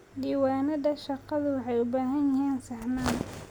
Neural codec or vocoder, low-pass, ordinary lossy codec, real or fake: none; none; none; real